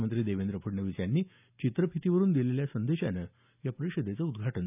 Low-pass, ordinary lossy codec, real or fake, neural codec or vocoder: 3.6 kHz; none; real; none